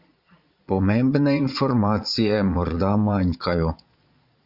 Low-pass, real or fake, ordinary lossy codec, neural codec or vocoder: 5.4 kHz; fake; Opus, 64 kbps; vocoder, 22.05 kHz, 80 mel bands, Vocos